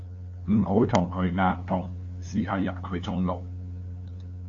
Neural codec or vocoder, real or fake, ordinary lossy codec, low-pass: codec, 16 kHz, 2 kbps, FunCodec, trained on LibriTTS, 25 frames a second; fake; AAC, 48 kbps; 7.2 kHz